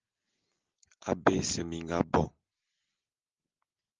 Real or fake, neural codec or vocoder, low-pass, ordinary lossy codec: real; none; 7.2 kHz; Opus, 24 kbps